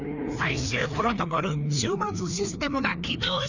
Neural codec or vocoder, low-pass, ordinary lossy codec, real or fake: codec, 16 kHz, 2 kbps, FreqCodec, larger model; 7.2 kHz; none; fake